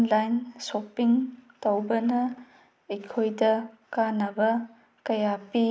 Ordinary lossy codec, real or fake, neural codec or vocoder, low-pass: none; real; none; none